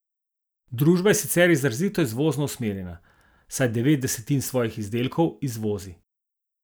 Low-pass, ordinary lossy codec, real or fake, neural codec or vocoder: none; none; real; none